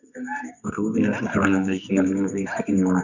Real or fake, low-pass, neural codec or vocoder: fake; 7.2 kHz; codec, 24 kHz, 0.9 kbps, WavTokenizer, medium music audio release